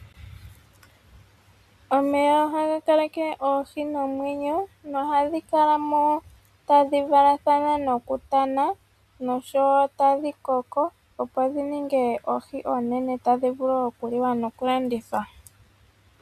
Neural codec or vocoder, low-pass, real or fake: none; 14.4 kHz; real